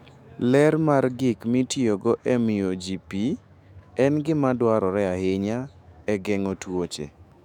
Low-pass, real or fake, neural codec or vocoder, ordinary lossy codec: 19.8 kHz; fake; autoencoder, 48 kHz, 128 numbers a frame, DAC-VAE, trained on Japanese speech; none